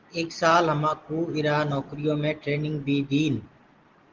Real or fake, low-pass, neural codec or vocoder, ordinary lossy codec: real; 7.2 kHz; none; Opus, 16 kbps